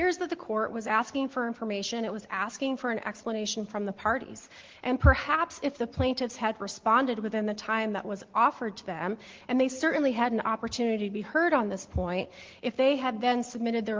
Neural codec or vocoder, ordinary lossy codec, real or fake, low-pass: none; Opus, 16 kbps; real; 7.2 kHz